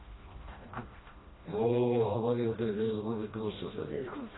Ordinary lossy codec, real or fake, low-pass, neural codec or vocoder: AAC, 16 kbps; fake; 7.2 kHz; codec, 16 kHz, 1 kbps, FreqCodec, smaller model